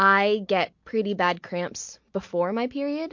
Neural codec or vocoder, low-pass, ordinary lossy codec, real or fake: none; 7.2 kHz; MP3, 48 kbps; real